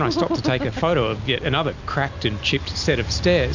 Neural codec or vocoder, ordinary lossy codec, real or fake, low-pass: none; Opus, 64 kbps; real; 7.2 kHz